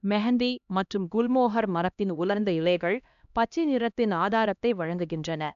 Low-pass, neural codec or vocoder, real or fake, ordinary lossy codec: 7.2 kHz; codec, 16 kHz, 1 kbps, X-Codec, HuBERT features, trained on LibriSpeech; fake; none